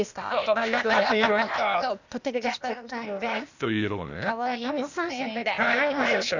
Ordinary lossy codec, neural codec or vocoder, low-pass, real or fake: none; codec, 16 kHz, 0.8 kbps, ZipCodec; 7.2 kHz; fake